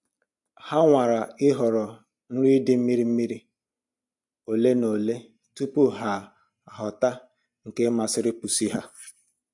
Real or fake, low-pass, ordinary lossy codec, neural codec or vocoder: real; 10.8 kHz; MP3, 64 kbps; none